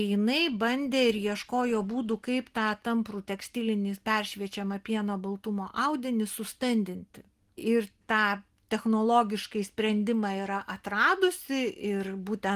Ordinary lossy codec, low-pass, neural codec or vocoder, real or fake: Opus, 24 kbps; 14.4 kHz; none; real